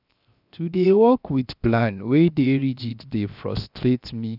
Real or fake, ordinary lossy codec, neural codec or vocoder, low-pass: fake; none; codec, 16 kHz, 0.7 kbps, FocalCodec; 5.4 kHz